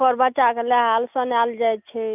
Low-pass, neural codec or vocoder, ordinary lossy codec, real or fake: 3.6 kHz; none; none; real